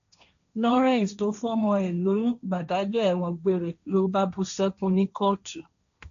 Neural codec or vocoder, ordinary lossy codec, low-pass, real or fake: codec, 16 kHz, 1.1 kbps, Voila-Tokenizer; none; 7.2 kHz; fake